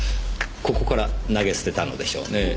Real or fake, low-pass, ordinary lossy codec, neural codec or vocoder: real; none; none; none